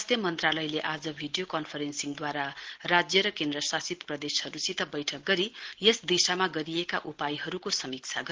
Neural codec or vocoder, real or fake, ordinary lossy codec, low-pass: none; real; Opus, 16 kbps; 7.2 kHz